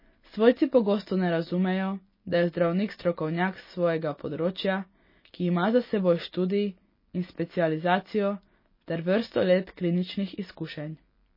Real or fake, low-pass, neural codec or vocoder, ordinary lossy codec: real; 5.4 kHz; none; MP3, 24 kbps